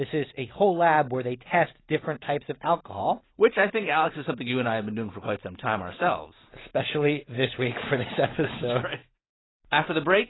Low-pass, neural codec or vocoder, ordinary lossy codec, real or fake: 7.2 kHz; none; AAC, 16 kbps; real